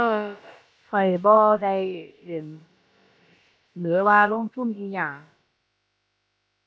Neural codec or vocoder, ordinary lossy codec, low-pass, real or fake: codec, 16 kHz, about 1 kbps, DyCAST, with the encoder's durations; none; none; fake